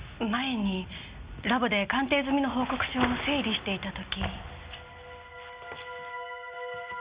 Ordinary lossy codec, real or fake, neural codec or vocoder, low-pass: Opus, 64 kbps; real; none; 3.6 kHz